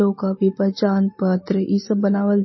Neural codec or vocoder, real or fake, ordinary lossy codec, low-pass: none; real; MP3, 24 kbps; 7.2 kHz